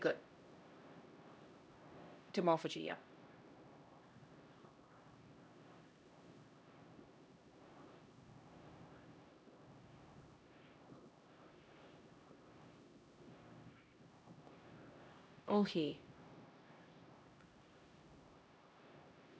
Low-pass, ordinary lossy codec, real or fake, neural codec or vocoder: none; none; fake; codec, 16 kHz, 1 kbps, X-Codec, HuBERT features, trained on LibriSpeech